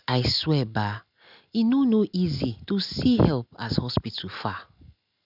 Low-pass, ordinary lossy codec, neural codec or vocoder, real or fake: 5.4 kHz; none; none; real